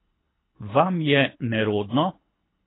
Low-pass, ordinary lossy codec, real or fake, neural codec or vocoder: 7.2 kHz; AAC, 16 kbps; fake; codec, 24 kHz, 6 kbps, HILCodec